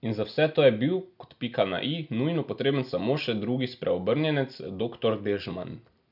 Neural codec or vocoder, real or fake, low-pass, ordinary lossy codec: none; real; 5.4 kHz; none